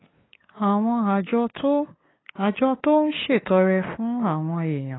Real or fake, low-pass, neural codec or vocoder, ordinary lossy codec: fake; 7.2 kHz; codec, 16 kHz, 8 kbps, FunCodec, trained on LibriTTS, 25 frames a second; AAC, 16 kbps